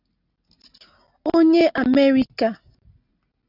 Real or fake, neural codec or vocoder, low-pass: real; none; 5.4 kHz